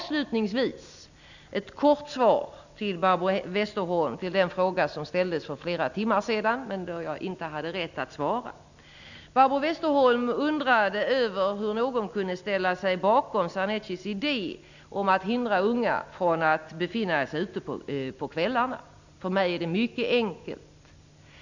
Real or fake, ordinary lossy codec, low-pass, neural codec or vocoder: real; none; 7.2 kHz; none